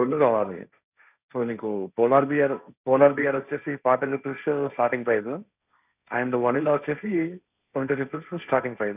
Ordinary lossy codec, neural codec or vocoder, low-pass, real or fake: none; codec, 16 kHz, 1.1 kbps, Voila-Tokenizer; 3.6 kHz; fake